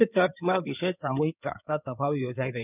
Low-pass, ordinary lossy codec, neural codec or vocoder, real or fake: 3.6 kHz; none; codec, 16 kHz in and 24 kHz out, 2.2 kbps, FireRedTTS-2 codec; fake